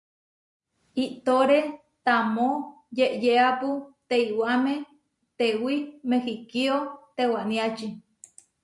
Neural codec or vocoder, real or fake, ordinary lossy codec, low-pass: none; real; MP3, 64 kbps; 10.8 kHz